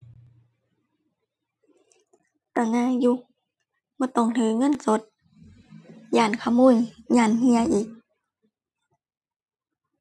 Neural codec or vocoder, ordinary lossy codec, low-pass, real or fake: none; none; none; real